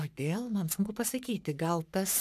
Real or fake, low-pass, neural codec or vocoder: fake; 14.4 kHz; codec, 44.1 kHz, 3.4 kbps, Pupu-Codec